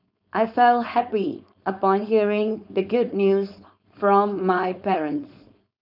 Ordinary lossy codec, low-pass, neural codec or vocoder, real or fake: none; 5.4 kHz; codec, 16 kHz, 4.8 kbps, FACodec; fake